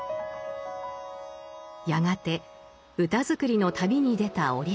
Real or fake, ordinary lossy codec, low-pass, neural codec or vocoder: real; none; none; none